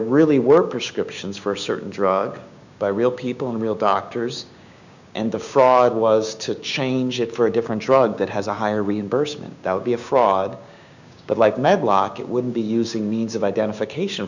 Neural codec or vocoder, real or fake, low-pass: codec, 16 kHz, 6 kbps, DAC; fake; 7.2 kHz